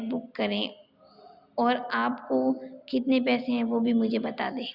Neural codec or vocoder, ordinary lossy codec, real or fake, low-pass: none; Opus, 64 kbps; real; 5.4 kHz